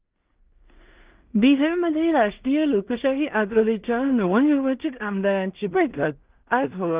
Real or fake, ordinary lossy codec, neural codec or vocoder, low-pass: fake; Opus, 32 kbps; codec, 16 kHz in and 24 kHz out, 0.4 kbps, LongCat-Audio-Codec, two codebook decoder; 3.6 kHz